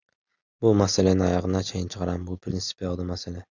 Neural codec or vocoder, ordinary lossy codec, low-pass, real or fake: none; Opus, 64 kbps; 7.2 kHz; real